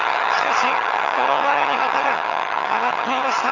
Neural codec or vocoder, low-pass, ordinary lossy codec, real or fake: vocoder, 22.05 kHz, 80 mel bands, HiFi-GAN; 7.2 kHz; none; fake